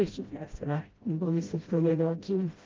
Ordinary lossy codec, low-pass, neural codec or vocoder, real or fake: Opus, 32 kbps; 7.2 kHz; codec, 16 kHz, 0.5 kbps, FreqCodec, smaller model; fake